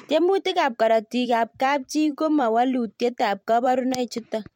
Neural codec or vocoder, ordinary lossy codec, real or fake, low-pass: vocoder, 44.1 kHz, 128 mel bands every 512 samples, BigVGAN v2; MP3, 64 kbps; fake; 19.8 kHz